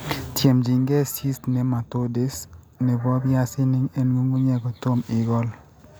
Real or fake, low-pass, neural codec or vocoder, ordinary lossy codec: real; none; none; none